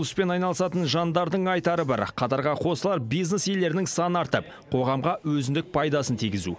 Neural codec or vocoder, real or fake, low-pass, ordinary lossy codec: none; real; none; none